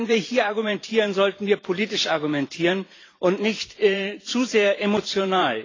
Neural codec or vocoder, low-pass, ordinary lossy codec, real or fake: vocoder, 44.1 kHz, 128 mel bands every 512 samples, BigVGAN v2; 7.2 kHz; AAC, 32 kbps; fake